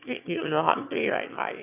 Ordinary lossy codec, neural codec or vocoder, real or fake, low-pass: none; autoencoder, 22.05 kHz, a latent of 192 numbers a frame, VITS, trained on one speaker; fake; 3.6 kHz